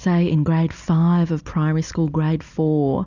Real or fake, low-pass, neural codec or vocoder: real; 7.2 kHz; none